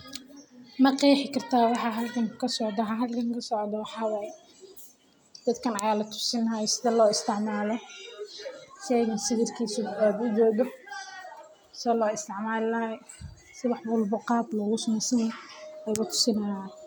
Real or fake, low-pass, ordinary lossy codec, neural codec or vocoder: real; none; none; none